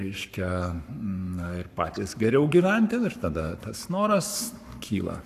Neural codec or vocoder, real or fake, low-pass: codec, 44.1 kHz, 7.8 kbps, Pupu-Codec; fake; 14.4 kHz